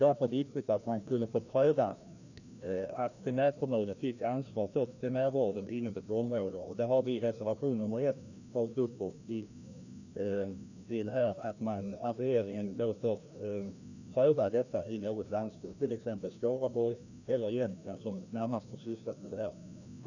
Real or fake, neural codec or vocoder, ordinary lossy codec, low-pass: fake; codec, 16 kHz, 1 kbps, FreqCodec, larger model; none; 7.2 kHz